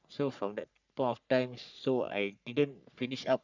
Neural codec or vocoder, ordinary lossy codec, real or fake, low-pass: codec, 44.1 kHz, 3.4 kbps, Pupu-Codec; none; fake; 7.2 kHz